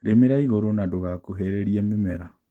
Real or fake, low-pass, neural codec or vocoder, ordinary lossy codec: fake; 19.8 kHz; autoencoder, 48 kHz, 128 numbers a frame, DAC-VAE, trained on Japanese speech; Opus, 16 kbps